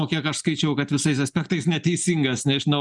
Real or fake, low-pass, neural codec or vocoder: real; 10.8 kHz; none